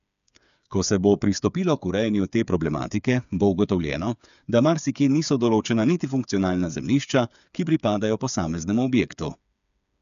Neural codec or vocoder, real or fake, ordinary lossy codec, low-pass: codec, 16 kHz, 8 kbps, FreqCodec, smaller model; fake; none; 7.2 kHz